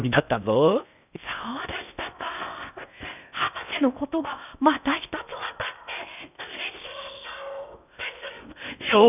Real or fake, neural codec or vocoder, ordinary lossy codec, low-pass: fake; codec, 16 kHz in and 24 kHz out, 0.6 kbps, FocalCodec, streaming, 4096 codes; none; 3.6 kHz